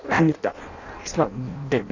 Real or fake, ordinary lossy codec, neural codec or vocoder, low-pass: fake; none; codec, 16 kHz in and 24 kHz out, 0.6 kbps, FireRedTTS-2 codec; 7.2 kHz